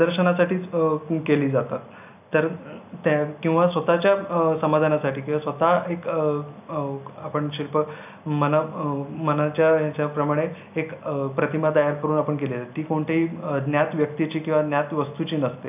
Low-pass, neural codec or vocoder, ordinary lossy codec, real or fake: 3.6 kHz; none; none; real